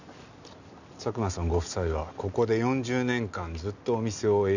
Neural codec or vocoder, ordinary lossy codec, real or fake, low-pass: none; none; real; 7.2 kHz